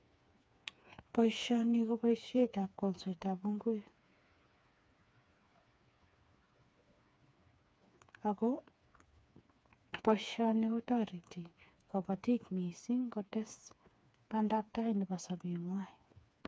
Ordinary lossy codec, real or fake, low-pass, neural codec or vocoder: none; fake; none; codec, 16 kHz, 4 kbps, FreqCodec, smaller model